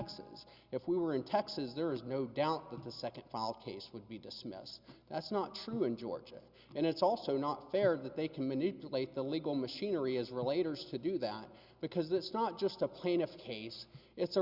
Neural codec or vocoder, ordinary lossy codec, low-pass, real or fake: none; Opus, 64 kbps; 5.4 kHz; real